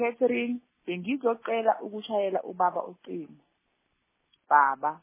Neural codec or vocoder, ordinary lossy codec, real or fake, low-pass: none; MP3, 16 kbps; real; 3.6 kHz